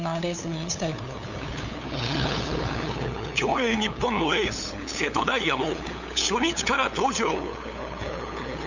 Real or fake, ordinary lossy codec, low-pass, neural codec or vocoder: fake; none; 7.2 kHz; codec, 16 kHz, 8 kbps, FunCodec, trained on LibriTTS, 25 frames a second